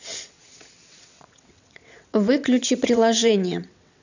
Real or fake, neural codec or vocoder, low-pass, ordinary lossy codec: fake; vocoder, 22.05 kHz, 80 mel bands, WaveNeXt; 7.2 kHz; none